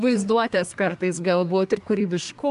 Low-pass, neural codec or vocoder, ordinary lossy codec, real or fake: 10.8 kHz; codec, 24 kHz, 1 kbps, SNAC; AAC, 96 kbps; fake